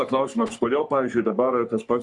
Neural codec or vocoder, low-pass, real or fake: codec, 44.1 kHz, 3.4 kbps, Pupu-Codec; 10.8 kHz; fake